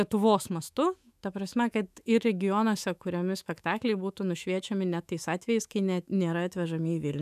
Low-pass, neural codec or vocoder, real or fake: 14.4 kHz; autoencoder, 48 kHz, 128 numbers a frame, DAC-VAE, trained on Japanese speech; fake